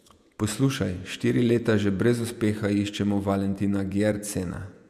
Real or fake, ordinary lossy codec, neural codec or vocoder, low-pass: fake; none; vocoder, 48 kHz, 128 mel bands, Vocos; 14.4 kHz